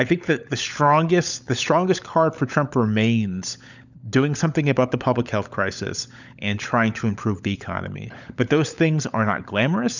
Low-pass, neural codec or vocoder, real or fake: 7.2 kHz; codec, 16 kHz, 16 kbps, FunCodec, trained on LibriTTS, 50 frames a second; fake